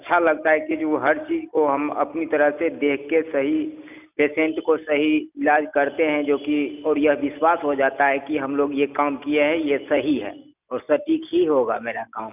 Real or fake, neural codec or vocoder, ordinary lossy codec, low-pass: real; none; none; 3.6 kHz